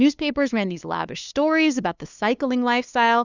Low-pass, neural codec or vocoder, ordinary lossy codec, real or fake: 7.2 kHz; codec, 16 kHz, 2 kbps, FunCodec, trained on LibriTTS, 25 frames a second; Opus, 64 kbps; fake